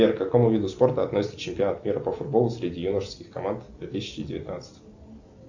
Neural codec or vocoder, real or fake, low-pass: none; real; 7.2 kHz